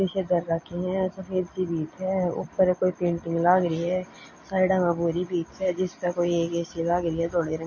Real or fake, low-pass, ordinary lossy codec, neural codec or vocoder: real; 7.2 kHz; MP3, 32 kbps; none